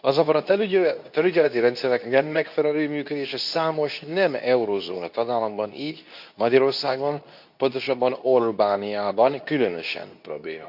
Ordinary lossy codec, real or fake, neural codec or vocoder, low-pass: none; fake; codec, 24 kHz, 0.9 kbps, WavTokenizer, medium speech release version 2; 5.4 kHz